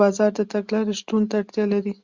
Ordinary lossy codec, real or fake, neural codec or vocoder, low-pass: Opus, 64 kbps; real; none; 7.2 kHz